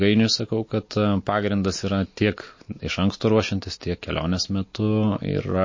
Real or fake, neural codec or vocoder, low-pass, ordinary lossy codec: real; none; 7.2 kHz; MP3, 32 kbps